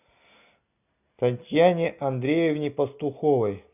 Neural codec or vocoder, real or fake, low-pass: none; real; 3.6 kHz